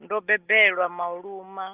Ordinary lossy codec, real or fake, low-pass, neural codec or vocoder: Opus, 32 kbps; real; 3.6 kHz; none